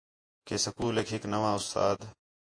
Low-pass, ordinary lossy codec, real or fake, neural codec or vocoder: 9.9 kHz; AAC, 48 kbps; fake; vocoder, 48 kHz, 128 mel bands, Vocos